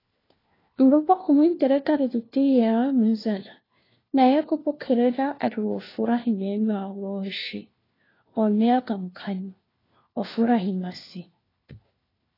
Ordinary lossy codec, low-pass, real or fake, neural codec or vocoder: AAC, 24 kbps; 5.4 kHz; fake; codec, 16 kHz, 1 kbps, FunCodec, trained on LibriTTS, 50 frames a second